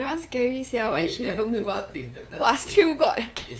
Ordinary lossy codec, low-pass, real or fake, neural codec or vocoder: none; none; fake; codec, 16 kHz, 2 kbps, FunCodec, trained on LibriTTS, 25 frames a second